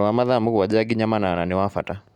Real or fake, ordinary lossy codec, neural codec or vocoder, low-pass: real; none; none; 19.8 kHz